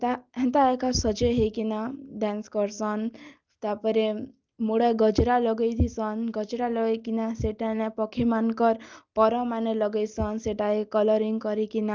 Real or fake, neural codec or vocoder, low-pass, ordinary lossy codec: real; none; 7.2 kHz; Opus, 24 kbps